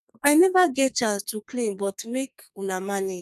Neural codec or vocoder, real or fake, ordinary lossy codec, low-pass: codec, 32 kHz, 1.9 kbps, SNAC; fake; none; 14.4 kHz